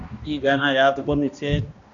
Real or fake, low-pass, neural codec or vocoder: fake; 7.2 kHz; codec, 16 kHz, 1 kbps, X-Codec, HuBERT features, trained on balanced general audio